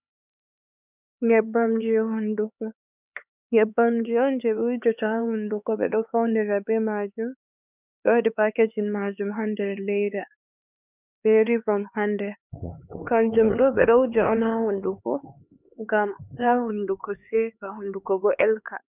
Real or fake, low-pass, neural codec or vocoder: fake; 3.6 kHz; codec, 16 kHz, 4 kbps, X-Codec, HuBERT features, trained on LibriSpeech